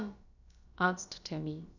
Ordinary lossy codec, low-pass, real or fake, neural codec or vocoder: none; 7.2 kHz; fake; codec, 16 kHz, about 1 kbps, DyCAST, with the encoder's durations